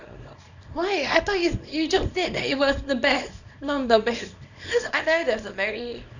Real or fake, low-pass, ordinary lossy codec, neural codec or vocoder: fake; 7.2 kHz; none; codec, 24 kHz, 0.9 kbps, WavTokenizer, small release